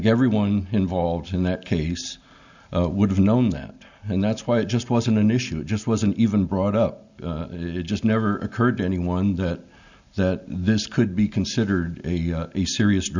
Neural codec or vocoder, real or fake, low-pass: none; real; 7.2 kHz